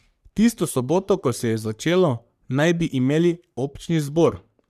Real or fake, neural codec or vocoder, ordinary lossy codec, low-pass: fake; codec, 44.1 kHz, 3.4 kbps, Pupu-Codec; none; 14.4 kHz